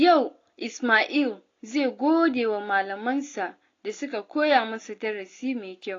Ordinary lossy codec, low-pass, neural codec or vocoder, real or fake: AAC, 32 kbps; 7.2 kHz; none; real